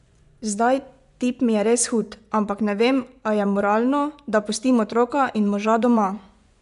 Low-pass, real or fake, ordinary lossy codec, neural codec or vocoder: 10.8 kHz; fake; none; vocoder, 24 kHz, 100 mel bands, Vocos